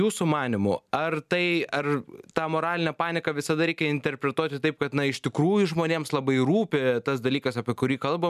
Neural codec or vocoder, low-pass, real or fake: none; 14.4 kHz; real